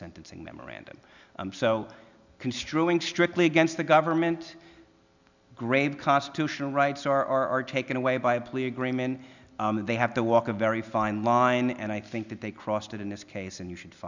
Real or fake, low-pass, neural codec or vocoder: real; 7.2 kHz; none